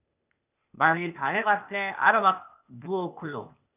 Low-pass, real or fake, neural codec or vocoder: 3.6 kHz; fake; codec, 16 kHz, 0.8 kbps, ZipCodec